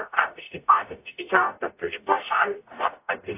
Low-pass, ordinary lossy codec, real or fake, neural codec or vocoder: 3.6 kHz; AAC, 32 kbps; fake; codec, 44.1 kHz, 0.9 kbps, DAC